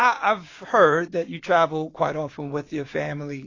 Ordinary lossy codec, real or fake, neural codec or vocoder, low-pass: AAC, 32 kbps; fake; codec, 16 kHz, 6 kbps, DAC; 7.2 kHz